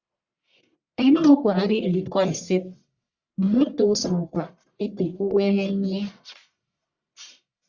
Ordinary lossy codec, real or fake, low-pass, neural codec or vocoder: Opus, 64 kbps; fake; 7.2 kHz; codec, 44.1 kHz, 1.7 kbps, Pupu-Codec